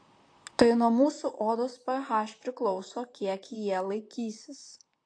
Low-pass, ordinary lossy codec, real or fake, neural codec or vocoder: 9.9 kHz; AAC, 48 kbps; fake; vocoder, 44.1 kHz, 128 mel bands, Pupu-Vocoder